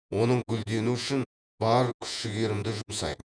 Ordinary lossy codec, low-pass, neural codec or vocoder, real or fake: none; 9.9 kHz; vocoder, 48 kHz, 128 mel bands, Vocos; fake